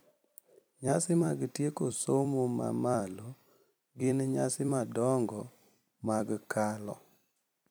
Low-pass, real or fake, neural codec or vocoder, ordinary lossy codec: none; fake; vocoder, 44.1 kHz, 128 mel bands every 256 samples, BigVGAN v2; none